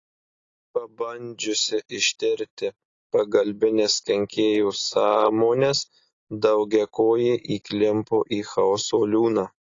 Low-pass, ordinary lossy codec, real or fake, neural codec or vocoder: 7.2 kHz; AAC, 32 kbps; real; none